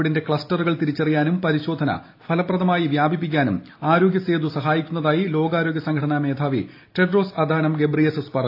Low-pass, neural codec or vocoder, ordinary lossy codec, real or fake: 5.4 kHz; none; AAC, 32 kbps; real